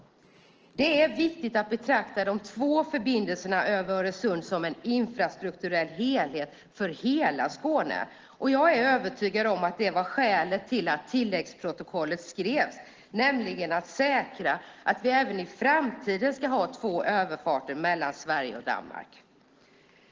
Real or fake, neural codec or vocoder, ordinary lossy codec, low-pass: real; none; Opus, 16 kbps; 7.2 kHz